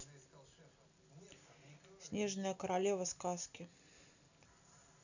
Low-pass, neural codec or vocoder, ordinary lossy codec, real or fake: 7.2 kHz; none; none; real